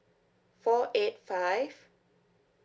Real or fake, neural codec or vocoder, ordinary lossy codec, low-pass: real; none; none; none